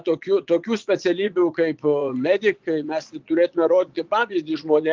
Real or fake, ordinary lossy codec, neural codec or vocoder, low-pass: fake; Opus, 32 kbps; vocoder, 22.05 kHz, 80 mel bands, Vocos; 7.2 kHz